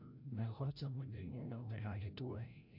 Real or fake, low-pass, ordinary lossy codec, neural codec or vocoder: fake; 5.4 kHz; AAC, 48 kbps; codec, 16 kHz, 0.5 kbps, FreqCodec, larger model